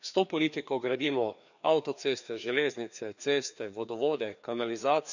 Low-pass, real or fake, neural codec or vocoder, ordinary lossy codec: 7.2 kHz; fake; codec, 16 kHz, 2 kbps, FreqCodec, larger model; none